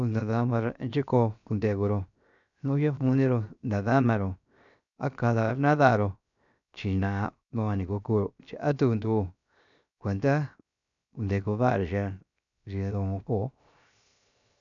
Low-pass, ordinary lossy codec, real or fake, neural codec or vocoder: 7.2 kHz; none; fake; codec, 16 kHz, 0.7 kbps, FocalCodec